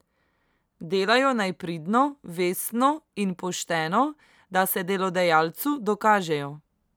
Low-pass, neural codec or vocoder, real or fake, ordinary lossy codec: none; none; real; none